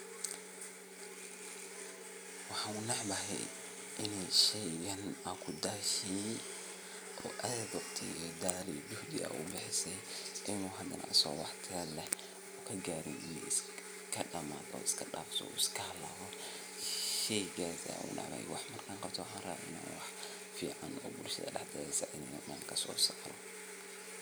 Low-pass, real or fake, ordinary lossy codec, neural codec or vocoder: none; real; none; none